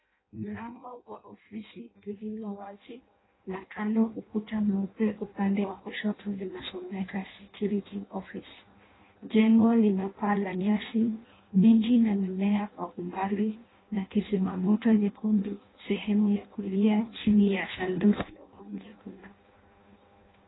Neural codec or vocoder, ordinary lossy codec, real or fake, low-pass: codec, 16 kHz in and 24 kHz out, 0.6 kbps, FireRedTTS-2 codec; AAC, 16 kbps; fake; 7.2 kHz